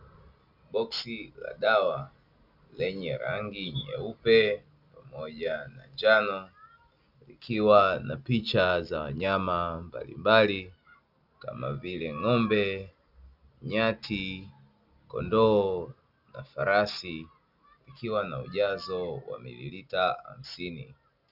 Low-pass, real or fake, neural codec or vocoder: 5.4 kHz; real; none